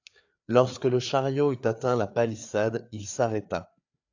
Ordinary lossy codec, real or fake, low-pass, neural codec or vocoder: AAC, 48 kbps; fake; 7.2 kHz; codec, 16 kHz, 4 kbps, FreqCodec, larger model